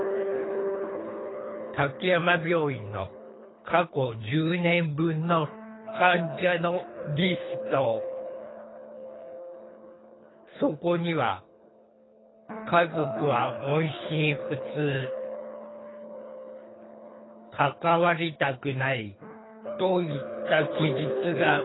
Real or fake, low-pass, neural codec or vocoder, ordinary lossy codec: fake; 7.2 kHz; codec, 24 kHz, 3 kbps, HILCodec; AAC, 16 kbps